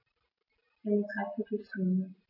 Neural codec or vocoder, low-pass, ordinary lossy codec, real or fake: none; 5.4 kHz; none; real